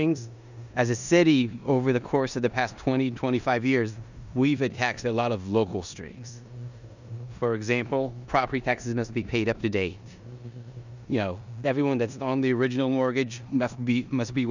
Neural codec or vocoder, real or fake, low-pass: codec, 16 kHz in and 24 kHz out, 0.9 kbps, LongCat-Audio-Codec, four codebook decoder; fake; 7.2 kHz